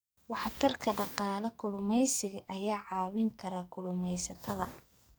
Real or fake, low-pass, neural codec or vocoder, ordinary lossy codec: fake; none; codec, 44.1 kHz, 2.6 kbps, SNAC; none